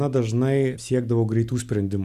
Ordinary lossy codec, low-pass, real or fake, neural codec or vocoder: Opus, 64 kbps; 14.4 kHz; real; none